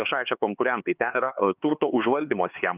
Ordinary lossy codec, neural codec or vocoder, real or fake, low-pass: Opus, 24 kbps; codec, 16 kHz, 4 kbps, X-Codec, HuBERT features, trained on LibriSpeech; fake; 3.6 kHz